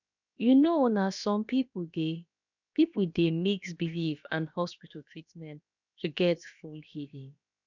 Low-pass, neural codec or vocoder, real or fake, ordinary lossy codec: 7.2 kHz; codec, 16 kHz, about 1 kbps, DyCAST, with the encoder's durations; fake; none